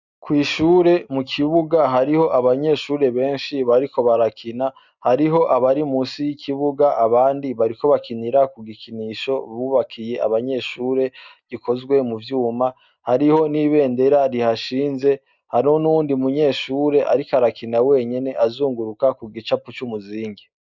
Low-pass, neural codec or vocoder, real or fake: 7.2 kHz; none; real